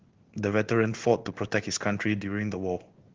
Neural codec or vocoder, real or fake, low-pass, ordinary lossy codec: codec, 16 kHz in and 24 kHz out, 1 kbps, XY-Tokenizer; fake; 7.2 kHz; Opus, 16 kbps